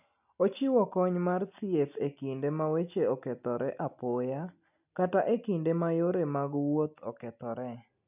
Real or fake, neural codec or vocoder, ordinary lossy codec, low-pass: real; none; none; 3.6 kHz